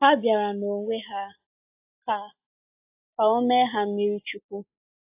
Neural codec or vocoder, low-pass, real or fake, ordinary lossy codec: none; 3.6 kHz; real; AAC, 32 kbps